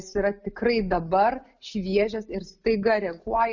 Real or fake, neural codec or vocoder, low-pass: real; none; 7.2 kHz